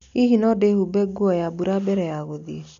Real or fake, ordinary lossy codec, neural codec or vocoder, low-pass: real; none; none; 7.2 kHz